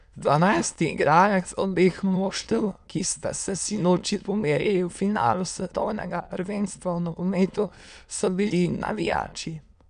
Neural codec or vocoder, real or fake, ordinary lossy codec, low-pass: autoencoder, 22.05 kHz, a latent of 192 numbers a frame, VITS, trained on many speakers; fake; none; 9.9 kHz